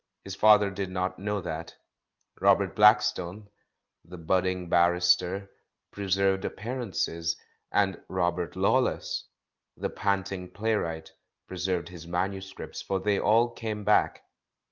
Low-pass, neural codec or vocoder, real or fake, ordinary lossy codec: 7.2 kHz; none; real; Opus, 24 kbps